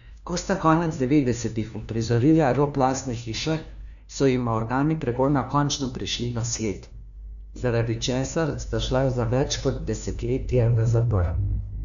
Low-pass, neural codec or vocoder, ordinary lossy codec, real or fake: 7.2 kHz; codec, 16 kHz, 1 kbps, FunCodec, trained on LibriTTS, 50 frames a second; none; fake